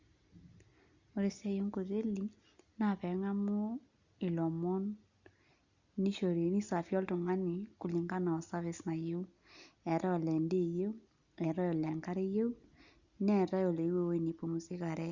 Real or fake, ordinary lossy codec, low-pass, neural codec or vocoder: real; Opus, 64 kbps; 7.2 kHz; none